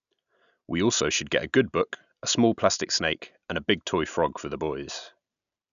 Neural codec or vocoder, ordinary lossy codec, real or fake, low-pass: none; none; real; 7.2 kHz